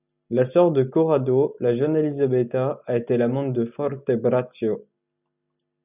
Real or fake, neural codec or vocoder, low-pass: real; none; 3.6 kHz